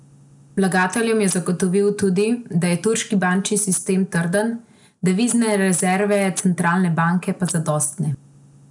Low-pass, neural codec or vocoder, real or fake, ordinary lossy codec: 10.8 kHz; none; real; none